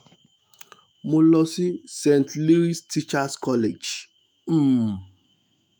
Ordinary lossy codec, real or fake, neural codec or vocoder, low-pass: none; fake; autoencoder, 48 kHz, 128 numbers a frame, DAC-VAE, trained on Japanese speech; none